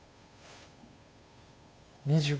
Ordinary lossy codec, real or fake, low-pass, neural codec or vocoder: none; fake; none; codec, 16 kHz, 2 kbps, FunCodec, trained on Chinese and English, 25 frames a second